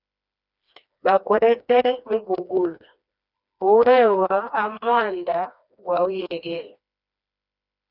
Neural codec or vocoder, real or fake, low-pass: codec, 16 kHz, 2 kbps, FreqCodec, smaller model; fake; 5.4 kHz